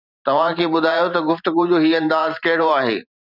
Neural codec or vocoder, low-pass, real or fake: vocoder, 44.1 kHz, 128 mel bands every 512 samples, BigVGAN v2; 5.4 kHz; fake